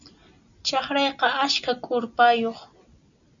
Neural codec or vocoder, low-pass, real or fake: none; 7.2 kHz; real